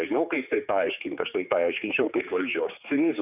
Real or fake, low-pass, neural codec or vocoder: fake; 3.6 kHz; codec, 16 kHz, 4 kbps, X-Codec, HuBERT features, trained on general audio